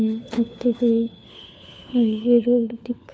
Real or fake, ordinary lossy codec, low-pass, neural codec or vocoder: fake; none; none; codec, 16 kHz, 4 kbps, FunCodec, trained on LibriTTS, 50 frames a second